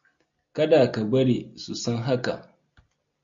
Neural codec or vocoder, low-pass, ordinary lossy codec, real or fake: none; 7.2 kHz; MP3, 64 kbps; real